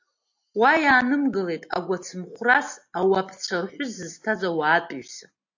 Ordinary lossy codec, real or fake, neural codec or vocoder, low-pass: AAC, 48 kbps; real; none; 7.2 kHz